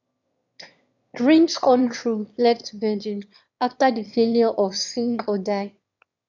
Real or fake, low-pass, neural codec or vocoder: fake; 7.2 kHz; autoencoder, 22.05 kHz, a latent of 192 numbers a frame, VITS, trained on one speaker